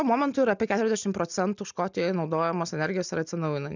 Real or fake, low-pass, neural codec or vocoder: real; 7.2 kHz; none